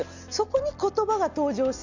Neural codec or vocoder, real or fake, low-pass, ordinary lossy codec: none; real; 7.2 kHz; none